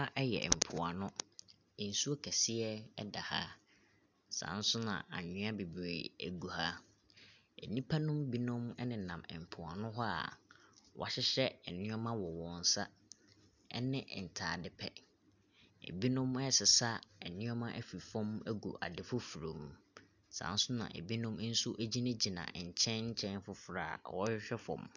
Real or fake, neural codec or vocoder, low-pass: real; none; 7.2 kHz